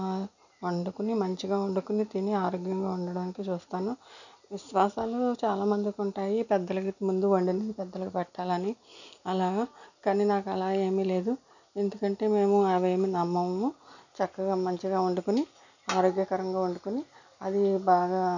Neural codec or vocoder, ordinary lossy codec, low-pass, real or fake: none; none; 7.2 kHz; real